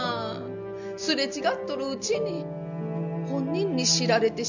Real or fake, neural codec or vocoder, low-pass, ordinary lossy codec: real; none; 7.2 kHz; none